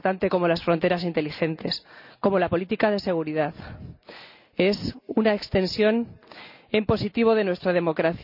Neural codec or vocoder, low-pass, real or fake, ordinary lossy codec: none; 5.4 kHz; real; none